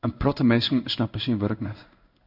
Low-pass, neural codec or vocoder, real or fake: 5.4 kHz; codec, 16 kHz in and 24 kHz out, 1 kbps, XY-Tokenizer; fake